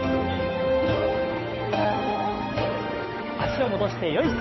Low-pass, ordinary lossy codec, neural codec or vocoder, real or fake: 7.2 kHz; MP3, 24 kbps; codec, 16 kHz, 8 kbps, FunCodec, trained on Chinese and English, 25 frames a second; fake